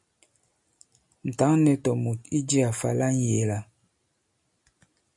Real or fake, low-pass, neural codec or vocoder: real; 10.8 kHz; none